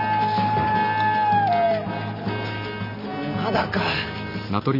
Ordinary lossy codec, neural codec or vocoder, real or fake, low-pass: none; none; real; 5.4 kHz